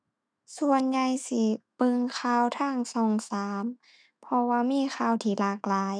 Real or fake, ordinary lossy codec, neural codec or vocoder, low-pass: fake; none; autoencoder, 48 kHz, 128 numbers a frame, DAC-VAE, trained on Japanese speech; 9.9 kHz